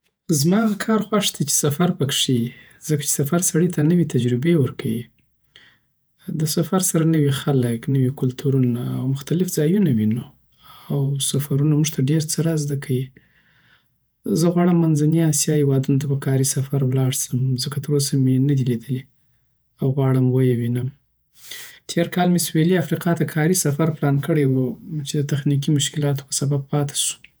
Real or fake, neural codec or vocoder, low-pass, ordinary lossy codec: fake; vocoder, 48 kHz, 128 mel bands, Vocos; none; none